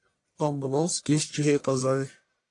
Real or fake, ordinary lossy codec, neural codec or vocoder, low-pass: fake; AAC, 48 kbps; codec, 44.1 kHz, 1.7 kbps, Pupu-Codec; 10.8 kHz